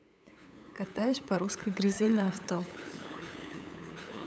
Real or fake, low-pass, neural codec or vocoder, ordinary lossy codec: fake; none; codec, 16 kHz, 8 kbps, FunCodec, trained on LibriTTS, 25 frames a second; none